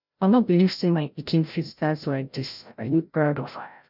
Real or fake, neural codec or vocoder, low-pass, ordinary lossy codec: fake; codec, 16 kHz, 0.5 kbps, FreqCodec, larger model; 5.4 kHz; none